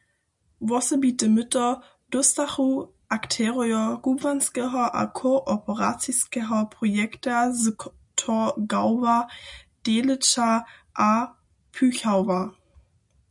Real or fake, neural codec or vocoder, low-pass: real; none; 10.8 kHz